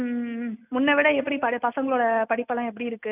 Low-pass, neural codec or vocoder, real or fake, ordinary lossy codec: 3.6 kHz; none; real; none